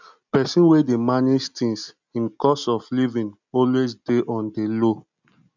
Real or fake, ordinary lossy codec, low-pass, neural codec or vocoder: real; none; 7.2 kHz; none